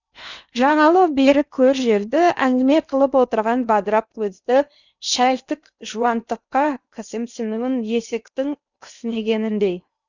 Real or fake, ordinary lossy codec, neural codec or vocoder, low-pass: fake; none; codec, 16 kHz in and 24 kHz out, 0.8 kbps, FocalCodec, streaming, 65536 codes; 7.2 kHz